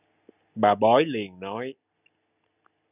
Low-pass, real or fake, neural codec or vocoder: 3.6 kHz; real; none